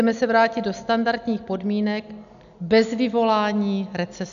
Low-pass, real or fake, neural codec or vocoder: 7.2 kHz; real; none